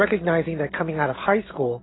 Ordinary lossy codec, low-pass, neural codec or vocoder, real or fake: AAC, 16 kbps; 7.2 kHz; none; real